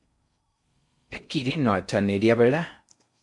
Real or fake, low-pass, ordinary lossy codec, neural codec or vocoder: fake; 10.8 kHz; MP3, 64 kbps; codec, 16 kHz in and 24 kHz out, 0.6 kbps, FocalCodec, streaming, 4096 codes